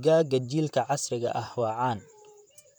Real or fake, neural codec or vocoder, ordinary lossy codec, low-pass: fake; vocoder, 44.1 kHz, 128 mel bands, Pupu-Vocoder; none; none